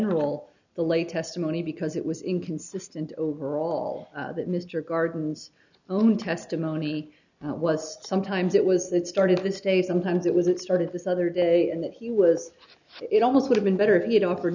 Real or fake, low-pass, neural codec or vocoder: real; 7.2 kHz; none